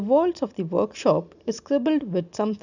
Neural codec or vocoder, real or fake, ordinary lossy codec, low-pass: none; real; none; 7.2 kHz